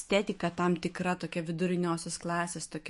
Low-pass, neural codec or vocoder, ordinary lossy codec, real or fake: 14.4 kHz; autoencoder, 48 kHz, 128 numbers a frame, DAC-VAE, trained on Japanese speech; MP3, 48 kbps; fake